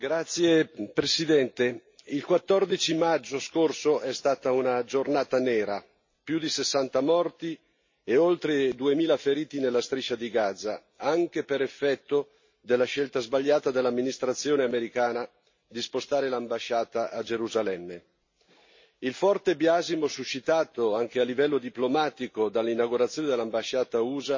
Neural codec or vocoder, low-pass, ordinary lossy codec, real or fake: none; 7.2 kHz; MP3, 32 kbps; real